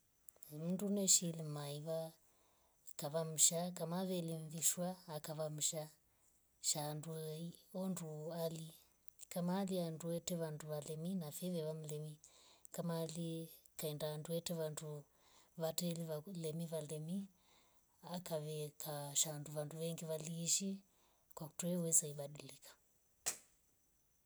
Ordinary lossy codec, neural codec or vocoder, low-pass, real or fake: none; none; none; real